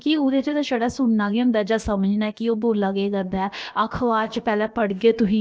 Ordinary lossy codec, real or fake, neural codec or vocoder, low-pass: none; fake; codec, 16 kHz, about 1 kbps, DyCAST, with the encoder's durations; none